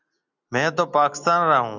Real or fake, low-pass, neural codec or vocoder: real; 7.2 kHz; none